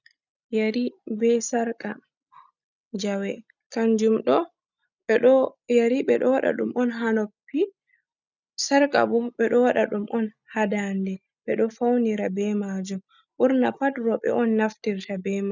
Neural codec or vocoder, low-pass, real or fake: none; 7.2 kHz; real